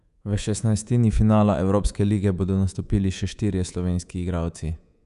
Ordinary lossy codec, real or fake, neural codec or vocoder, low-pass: MP3, 96 kbps; fake; codec, 24 kHz, 3.1 kbps, DualCodec; 10.8 kHz